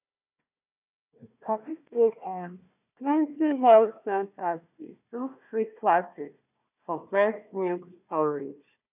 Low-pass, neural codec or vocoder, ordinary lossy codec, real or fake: 3.6 kHz; codec, 16 kHz, 1 kbps, FunCodec, trained on Chinese and English, 50 frames a second; none; fake